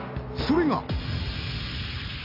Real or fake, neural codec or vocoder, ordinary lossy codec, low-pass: real; none; MP3, 48 kbps; 5.4 kHz